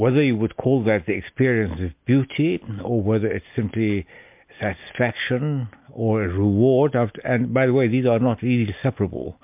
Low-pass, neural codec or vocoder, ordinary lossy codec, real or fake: 3.6 kHz; none; MP3, 32 kbps; real